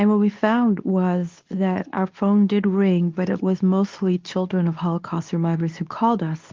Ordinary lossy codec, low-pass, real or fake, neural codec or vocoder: Opus, 24 kbps; 7.2 kHz; fake; codec, 24 kHz, 0.9 kbps, WavTokenizer, medium speech release version 2